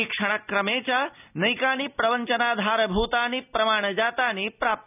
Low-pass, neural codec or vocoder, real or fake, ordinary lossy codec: 3.6 kHz; none; real; none